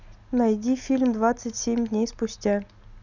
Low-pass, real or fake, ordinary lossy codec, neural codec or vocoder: 7.2 kHz; real; none; none